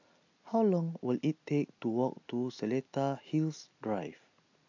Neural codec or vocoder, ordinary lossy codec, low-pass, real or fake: none; AAC, 48 kbps; 7.2 kHz; real